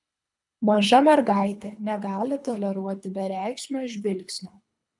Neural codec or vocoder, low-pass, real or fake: codec, 24 kHz, 3 kbps, HILCodec; 10.8 kHz; fake